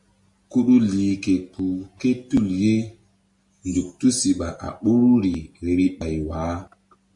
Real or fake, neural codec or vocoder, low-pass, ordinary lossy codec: real; none; 10.8 kHz; MP3, 64 kbps